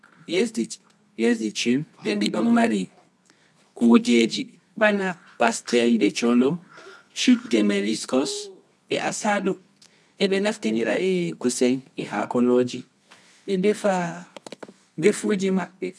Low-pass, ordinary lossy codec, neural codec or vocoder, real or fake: none; none; codec, 24 kHz, 0.9 kbps, WavTokenizer, medium music audio release; fake